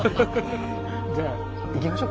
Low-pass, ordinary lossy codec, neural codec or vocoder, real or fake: none; none; none; real